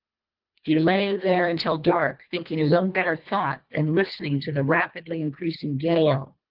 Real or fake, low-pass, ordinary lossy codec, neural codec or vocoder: fake; 5.4 kHz; Opus, 32 kbps; codec, 24 kHz, 1.5 kbps, HILCodec